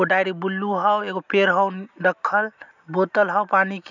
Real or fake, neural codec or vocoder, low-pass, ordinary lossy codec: real; none; 7.2 kHz; none